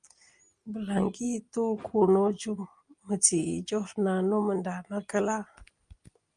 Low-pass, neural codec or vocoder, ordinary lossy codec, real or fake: 9.9 kHz; none; Opus, 32 kbps; real